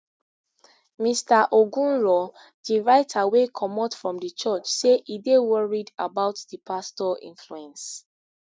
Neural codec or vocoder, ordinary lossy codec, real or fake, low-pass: none; none; real; none